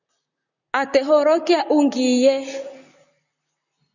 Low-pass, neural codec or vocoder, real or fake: 7.2 kHz; vocoder, 44.1 kHz, 128 mel bands, Pupu-Vocoder; fake